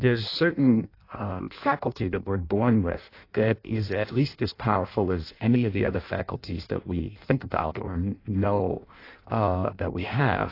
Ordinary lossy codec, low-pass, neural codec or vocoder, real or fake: AAC, 32 kbps; 5.4 kHz; codec, 16 kHz in and 24 kHz out, 0.6 kbps, FireRedTTS-2 codec; fake